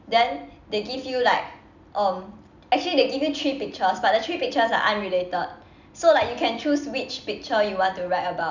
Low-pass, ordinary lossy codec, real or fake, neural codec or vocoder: 7.2 kHz; none; real; none